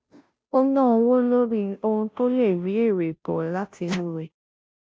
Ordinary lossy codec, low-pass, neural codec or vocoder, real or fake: none; none; codec, 16 kHz, 0.5 kbps, FunCodec, trained on Chinese and English, 25 frames a second; fake